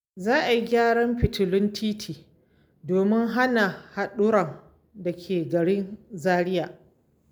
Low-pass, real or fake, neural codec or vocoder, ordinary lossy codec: none; real; none; none